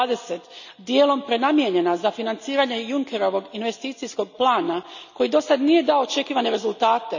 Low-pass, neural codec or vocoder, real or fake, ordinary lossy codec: 7.2 kHz; none; real; none